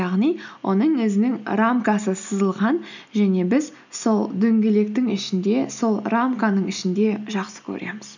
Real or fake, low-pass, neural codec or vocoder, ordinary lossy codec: real; 7.2 kHz; none; none